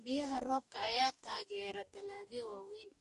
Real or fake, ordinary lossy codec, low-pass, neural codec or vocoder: fake; MP3, 48 kbps; 14.4 kHz; codec, 44.1 kHz, 2.6 kbps, DAC